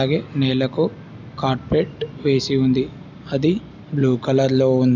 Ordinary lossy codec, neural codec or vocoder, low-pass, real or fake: none; none; 7.2 kHz; real